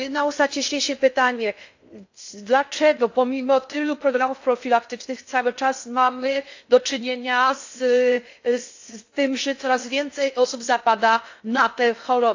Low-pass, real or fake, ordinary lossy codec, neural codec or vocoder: 7.2 kHz; fake; AAC, 48 kbps; codec, 16 kHz in and 24 kHz out, 0.6 kbps, FocalCodec, streaming, 2048 codes